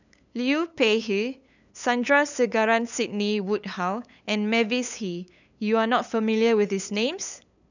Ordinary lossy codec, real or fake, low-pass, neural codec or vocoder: none; fake; 7.2 kHz; codec, 16 kHz, 8 kbps, FunCodec, trained on LibriTTS, 25 frames a second